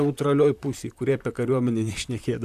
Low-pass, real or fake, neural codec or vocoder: 14.4 kHz; fake; vocoder, 44.1 kHz, 128 mel bands, Pupu-Vocoder